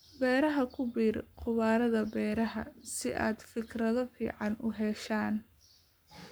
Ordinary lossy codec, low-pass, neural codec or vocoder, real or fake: none; none; codec, 44.1 kHz, 7.8 kbps, Pupu-Codec; fake